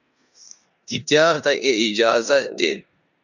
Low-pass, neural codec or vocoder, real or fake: 7.2 kHz; codec, 16 kHz in and 24 kHz out, 0.9 kbps, LongCat-Audio-Codec, four codebook decoder; fake